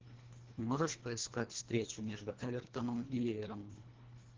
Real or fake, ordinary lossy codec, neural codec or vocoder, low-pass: fake; Opus, 16 kbps; codec, 24 kHz, 1.5 kbps, HILCodec; 7.2 kHz